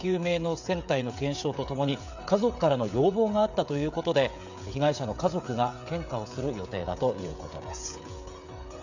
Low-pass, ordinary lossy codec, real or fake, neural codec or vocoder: 7.2 kHz; none; fake; codec, 16 kHz, 16 kbps, FreqCodec, smaller model